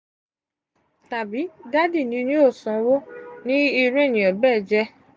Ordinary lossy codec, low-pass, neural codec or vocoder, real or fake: none; none; none; real